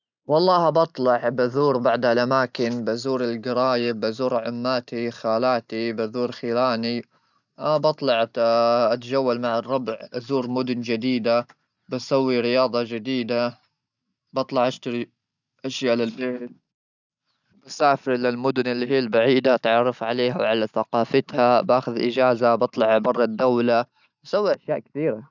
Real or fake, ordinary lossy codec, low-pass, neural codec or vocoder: real; none; 7.2 kHz; none